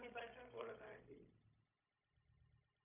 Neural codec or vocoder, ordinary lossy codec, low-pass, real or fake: codec, 16 kHz, 0.4 kbps, LongCat-Audio-Codec; AAC, 24 kbps; 3.6 kHz; fake